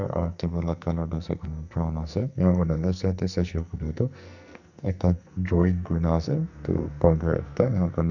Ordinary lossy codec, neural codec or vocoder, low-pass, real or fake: none; codec, 44.1 kHz, 2.6 kbps, SNAC; 7.2 kHz; fake